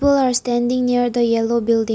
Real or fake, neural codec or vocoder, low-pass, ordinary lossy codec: real; none; none; none